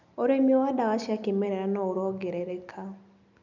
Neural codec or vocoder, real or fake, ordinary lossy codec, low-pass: none; real; none; 7.2 kHz